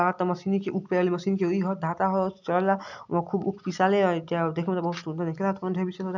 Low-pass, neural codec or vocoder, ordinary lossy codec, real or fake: 7.2 kHz; none; none; real